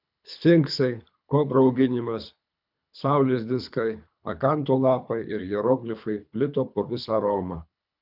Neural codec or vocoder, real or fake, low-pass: codec, 24 kHz, 3 kbps, HILCodec; fake; 5.4 kHz